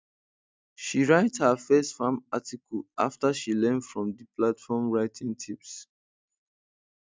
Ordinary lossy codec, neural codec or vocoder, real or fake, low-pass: none; none; real; none